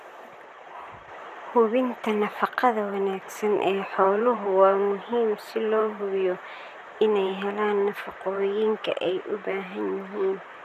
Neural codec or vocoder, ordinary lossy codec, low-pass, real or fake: vocoder, 44.1 kHz, 128 mel bands, Pupu-Vocoder; none; 14.4 kHz; fake